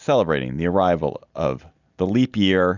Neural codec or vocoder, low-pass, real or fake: none; 7.2 kHz; real